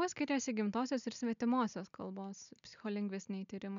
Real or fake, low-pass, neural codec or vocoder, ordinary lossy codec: real; 7.2 kHz; none; MP3, 96 kbps